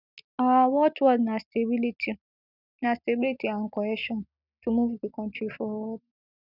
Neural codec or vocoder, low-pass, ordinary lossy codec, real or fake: none; 5.4 kHz; none; real